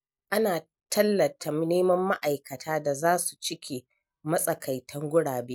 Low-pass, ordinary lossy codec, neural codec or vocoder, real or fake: none; none; none; real